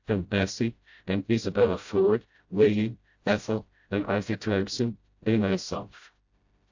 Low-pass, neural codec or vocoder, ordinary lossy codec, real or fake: 7.2 kHz; codec, 16 kHz, 0.5 kbps, FreqCodec, smaller model; AAC, 48 kbps; fake